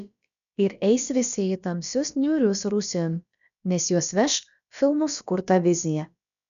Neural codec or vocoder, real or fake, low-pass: codec, 16 kHz, about 1 kbps, DyCAST, with the encoder's durations; fake; 7.2 kHz